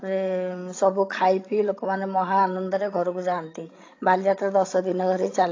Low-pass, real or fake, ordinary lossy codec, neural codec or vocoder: 7.2 kHz; fake; AAC, 32 kbps; codec, 16 kHz, 16 kbps, FreqCodec, larger model